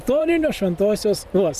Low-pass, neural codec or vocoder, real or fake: 14.4 kHz; vocoder, 44.1 kHz, 128 mel bands every 512 samples, BigVGAN v2; fake